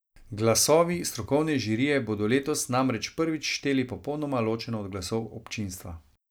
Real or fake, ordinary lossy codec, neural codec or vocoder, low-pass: real; none; none; none